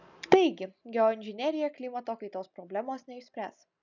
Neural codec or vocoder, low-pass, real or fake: none; 7.2 kHz; real